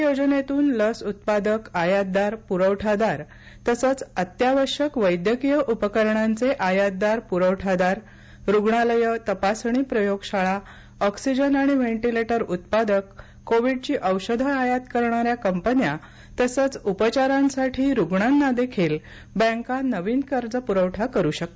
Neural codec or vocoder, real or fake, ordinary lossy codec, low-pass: none; real; none; none